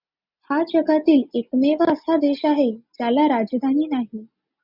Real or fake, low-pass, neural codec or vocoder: real; 5.4 kHz; none